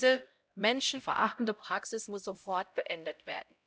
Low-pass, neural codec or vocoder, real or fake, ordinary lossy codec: none; codec, 16 kHz, 0.5 kbps, X-Codec, HuBERT features, trained on LibriSpeech; fake; none